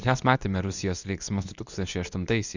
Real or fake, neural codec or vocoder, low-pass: fake; codec, 24 kHz, 0.9 kbps, WavTokenizer, medium speech release version 2; 7.2 kHz